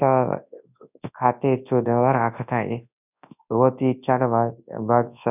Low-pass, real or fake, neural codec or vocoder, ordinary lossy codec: 3.6 kHz; fake; codec, 24 kHz, 0.9 kbps, WavTokenizer, large speech release; none